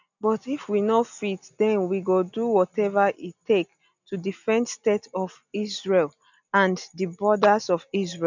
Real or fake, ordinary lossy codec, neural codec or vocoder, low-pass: real; none; none; 7.2 kHz